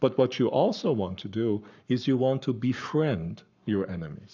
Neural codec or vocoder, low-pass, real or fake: codec, 44.1 kHz, 7.8 kbps, Pupu-Codec; 7.2 kHz; fake